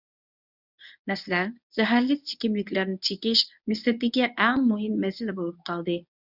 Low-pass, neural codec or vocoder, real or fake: 5.4 kHz; codec, 24 kHz, 0.9 kbps, WavTokenizer, medium speech release version 1; fake